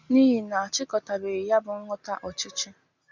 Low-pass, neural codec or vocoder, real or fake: 7.2 kHz; none; real